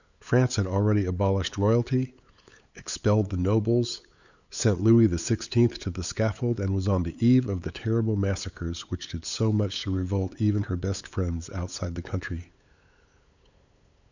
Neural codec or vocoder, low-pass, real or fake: codec, 16 kHz, 8 kbps, FunCodec, trained on LibriTTS, 25 frames a second; 7.2 kHz; fake